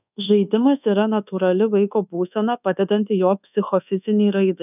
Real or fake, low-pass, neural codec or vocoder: fake; 3.6 kHz; codec, 24 kHz, 1.2 kbps, DualCodec